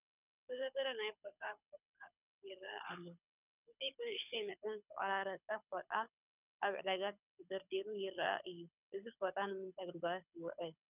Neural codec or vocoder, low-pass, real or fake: codec, 24 kHz, 6 kbps, HILCodec; 3.6 kHz; fake